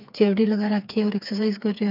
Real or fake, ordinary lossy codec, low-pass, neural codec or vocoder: fake; none; 5.4 kHz; codec, 16 kHz, 4 kbps, FreqCodec, smaller model